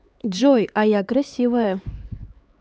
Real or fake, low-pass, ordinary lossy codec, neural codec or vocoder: fake; none; none; codec, 16 kHz, 4 kbps, X-Codec, HuBERT features, trained on LibriSpeech